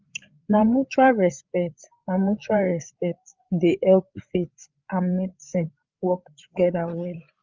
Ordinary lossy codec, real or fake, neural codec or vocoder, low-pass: Opus, 24 kbps; fake; codec, 16 kHz, 8 kbps, FreqCodec, larger model; 7.2 kHz